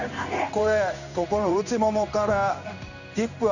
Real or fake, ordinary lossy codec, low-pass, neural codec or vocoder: fake; none; 7.2 kHz; codec, 16 kHz in and 24 kHz out, 1 kbps, XY-Tokenizer